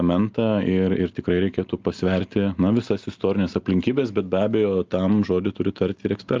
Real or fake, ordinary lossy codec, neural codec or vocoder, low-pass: real; Opus, 24 kbps; none; 7.2 kHz